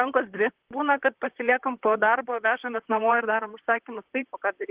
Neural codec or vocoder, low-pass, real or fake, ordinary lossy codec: vocoder, 44.1 kHz, 128 mel bands, Pupu-Vocoder; 3.6 kHz; fake; Opus, 16 kbps